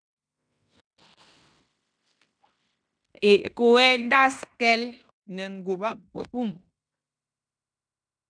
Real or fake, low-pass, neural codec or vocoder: fake; 9.9 kHz; codec, 16 kHz in and 24 kHz out, 0.9 kbps, LongCat-Audio-Codec, fine tuned four codebook decoder